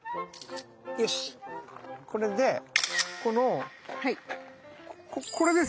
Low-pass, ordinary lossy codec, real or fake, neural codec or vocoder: none; none; real; none